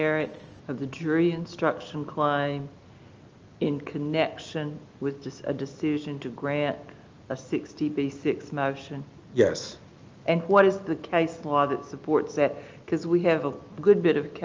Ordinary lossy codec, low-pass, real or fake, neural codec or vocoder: Opus, 24 kbps; 7.2 kHz; real; none